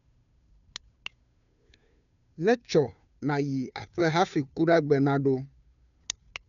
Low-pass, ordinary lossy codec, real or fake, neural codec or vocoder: 7.2 kHz; none; fake; codec, 16 kHz, 2 kbps, FunCodec, trained on Chinese and English, 25 frames a second